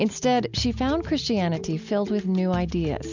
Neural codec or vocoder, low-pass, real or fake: none; 7.2 kHz; real